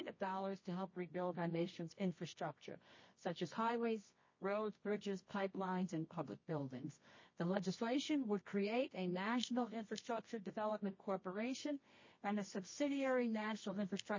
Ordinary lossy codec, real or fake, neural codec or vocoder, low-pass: MP3, 32 kbps; fake; codec, 24 kHz, 0.9 kbps, WavTokenizer, medium music audio release; 7.2 kHz